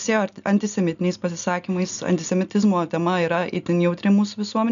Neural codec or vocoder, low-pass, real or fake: none; 7.2 kHz; real